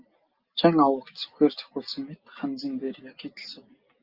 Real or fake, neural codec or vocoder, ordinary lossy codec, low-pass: real; none; Opus, 32 kbps; 5.4 kHz